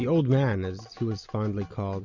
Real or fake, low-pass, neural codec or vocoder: real; 7.2 kHz; none